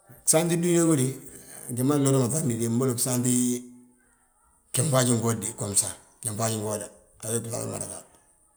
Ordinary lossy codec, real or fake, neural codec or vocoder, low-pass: none; real; none; none